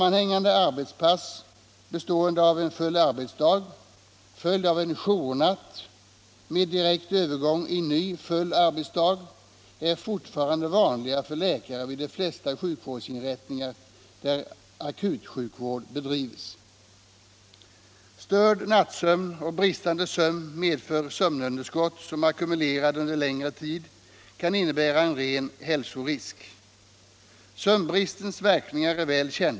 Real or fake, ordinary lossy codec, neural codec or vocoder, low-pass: real; none; none; none